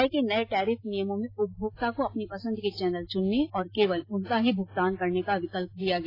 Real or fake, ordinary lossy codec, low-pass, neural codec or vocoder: real; AAC, 24 kbps; 5.4 kHz; none